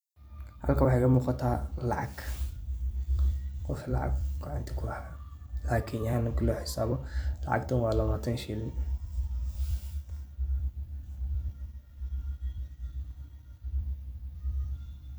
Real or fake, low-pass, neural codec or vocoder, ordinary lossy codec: fake; none; vocoder, 44.1 kHz, 128 mel bands every 256 samples, BigVGAN v2; none